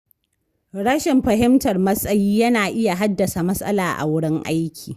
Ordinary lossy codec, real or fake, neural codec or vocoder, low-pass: Opus, 64 kbps; real; none; 14.4 kHz